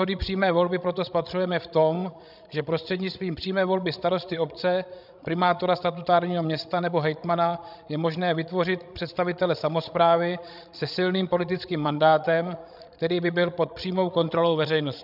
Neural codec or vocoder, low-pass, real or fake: codec, 16 kHz, 16 kbps, FreqCodec, larger model; 5.4 kHz; fake